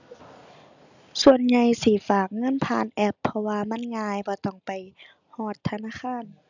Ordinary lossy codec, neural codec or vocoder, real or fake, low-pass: none; none; real; 7.2 kHz